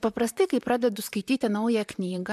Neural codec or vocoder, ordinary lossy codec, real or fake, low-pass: vocoder, 44.1 kHz, 128 mel bands, Pupu-Vocoder; MP3, 96 kbps; fake; 14.4 kHz